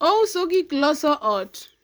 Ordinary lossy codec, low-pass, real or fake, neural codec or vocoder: none; none; fake; vocoder, 44.1 kHz, 128 mel bands every 512 samples, BigVGAN v2